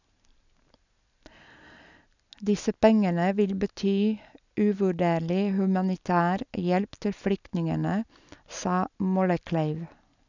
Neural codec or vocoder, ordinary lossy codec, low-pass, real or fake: none; none; 7.2 kHz; real